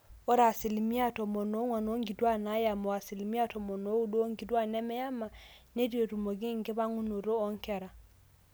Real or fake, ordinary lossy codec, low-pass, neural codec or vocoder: real; none; none; none